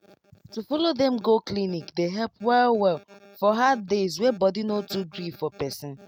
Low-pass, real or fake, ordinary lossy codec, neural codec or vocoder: 19.8 kHz; real; none; none